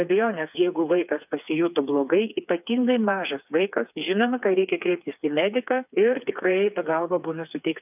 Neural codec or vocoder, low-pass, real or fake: codec, 16 kHz, 4 kbps, FreqCodec, smaller model; 3.6 kHz; fake